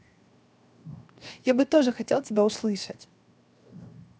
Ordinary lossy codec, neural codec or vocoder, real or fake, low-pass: none; codec, 16 kHz, 0.7 kbps, FocalCodec; fake; none